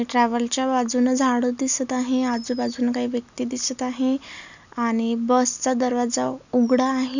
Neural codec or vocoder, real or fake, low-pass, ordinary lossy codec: none; real; 7.2 kHz; none